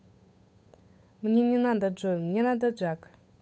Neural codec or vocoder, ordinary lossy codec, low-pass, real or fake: codec, 16 kHz, 8 kbps, FunCodec, trained on Chinese and English, 25 frames a second; none; none; fake